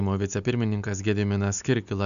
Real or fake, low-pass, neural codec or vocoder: real; 7.2 kHz; none